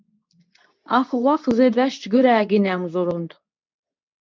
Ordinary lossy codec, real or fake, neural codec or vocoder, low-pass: MP3, 64 kbps; fake; codec, 24 kHz, 0.9 kbps, WavTokenizer, medium speech release version 2; 7.2 kHz